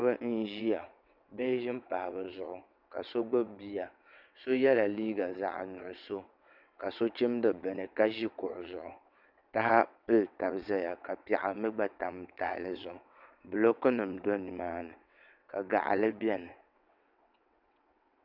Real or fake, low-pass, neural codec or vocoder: fake; 5.4 kHz; vocoder, 22.05 kHz, 80 mel bands, WaveNeXt